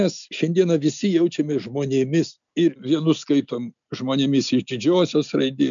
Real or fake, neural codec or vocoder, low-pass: real; none; 7.2 kHz